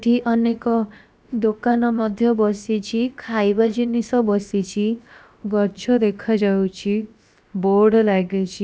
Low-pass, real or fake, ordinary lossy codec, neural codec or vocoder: none; fake; none; codec, 16 kHz, about 1 kbps, DyCAST, with the encoder's durations